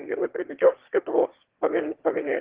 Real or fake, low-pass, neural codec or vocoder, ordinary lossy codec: fake; 3.6 kHz; autoencoder, 22.05 kHz, a latent of 192 numbers a frame, VITS, trained on one speaker; Opus, 16 kbps